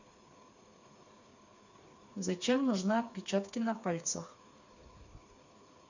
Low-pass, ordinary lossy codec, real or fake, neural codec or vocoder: 7.2 kHz; none; fake; codec, 16 kHz, 4 kbps, FreqCodec, smaller model